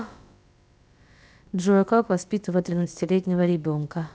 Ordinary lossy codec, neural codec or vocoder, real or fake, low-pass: none; codec, 16 kHz, about 1 kbps, DyCAST, with the encoder's durations; fake; none